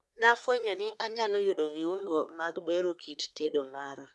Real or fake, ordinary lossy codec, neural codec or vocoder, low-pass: fake; none; codec, 24 kHz, 1 kbps, SNAC; none